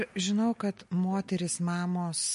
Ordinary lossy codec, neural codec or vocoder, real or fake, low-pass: MP3, 48 kbps; none; real; 14.4 kHz